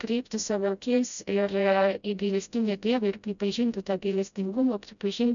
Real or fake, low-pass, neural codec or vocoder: fake; 7.2 kHz; codec, 16 kHz, 0.5 kbps, FreqCodec, smaller model